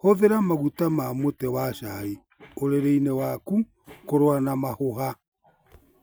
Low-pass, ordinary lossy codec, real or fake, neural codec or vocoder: none; none; real; none